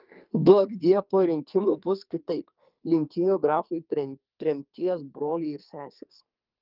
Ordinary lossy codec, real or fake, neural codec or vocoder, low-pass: Opus, 24 kbps; fake; codec, 44.1 kHz, 2.6 kbps, SNAC; 5.4 kHz